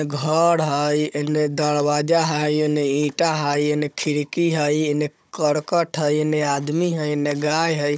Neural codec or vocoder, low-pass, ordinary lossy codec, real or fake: codec, 16 kHz, 16 kbps, FunCodec, trained on Chinese and English, 50 frames a second; none; none; fake